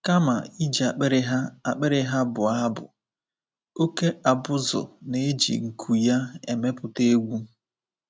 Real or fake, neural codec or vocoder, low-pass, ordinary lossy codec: real; none; none; none